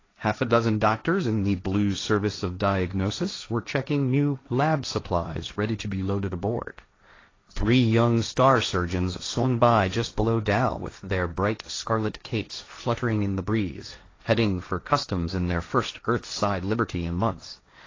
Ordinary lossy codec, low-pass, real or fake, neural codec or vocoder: AAC, 32 kbps; 7.2 kHz; fake; codec, 16 kHz, 1.1 kbps, Voila-Tokenizer